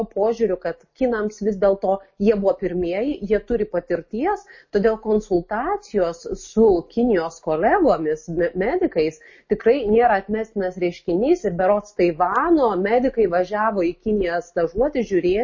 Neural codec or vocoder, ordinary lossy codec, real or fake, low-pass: none; MP3, 32 kbps; real; 7.2 kHz